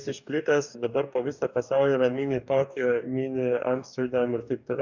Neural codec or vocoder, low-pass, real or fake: codec, 44.1 kHz, 2.6 kbps, DAC; 7.2 kHz; fake